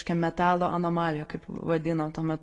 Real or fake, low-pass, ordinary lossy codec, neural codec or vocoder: real; 10.8 kHz; AAC, 32 kbps; none